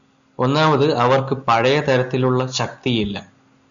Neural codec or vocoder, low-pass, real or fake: none; 7.2 kHz; real